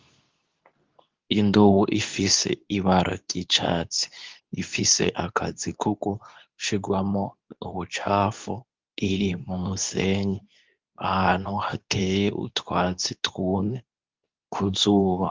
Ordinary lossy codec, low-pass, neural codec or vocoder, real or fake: Opus, 32 kbps; 7.2 kHz; codec, 24 kHz, 0.9 kbps, WavTokenizer, medium speech release version 2; fake